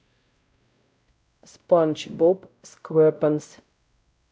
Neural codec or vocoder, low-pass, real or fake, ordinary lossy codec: codec, 16 kHz, 0.5 kbps, X-Codec, WavLM features, trained on Multilingual LibriSpeech; none; fake; none